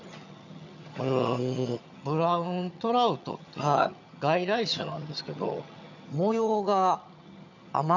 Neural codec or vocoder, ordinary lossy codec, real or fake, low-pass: vocoder, 22.05 kHz, 80 mel bands, HiFi-GAN; none; fake; 7.2 kHz